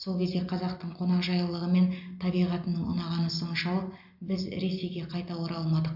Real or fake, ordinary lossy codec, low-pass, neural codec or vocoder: real; AAC, 48 kbps; 5.4 kHz; none